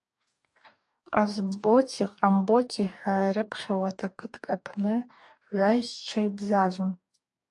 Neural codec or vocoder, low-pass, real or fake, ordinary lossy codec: codec, 44.1 kHz, 2.6 kbps, DAC; 10.8 kHz; fake; AAC, 64 kbps